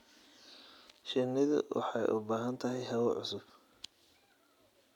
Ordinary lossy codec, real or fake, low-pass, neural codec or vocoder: none; real; 19.8 kHz; none